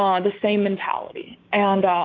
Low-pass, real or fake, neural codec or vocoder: 7.2 kHz; fake; codec, 16 kHz, 6 kbps, DAC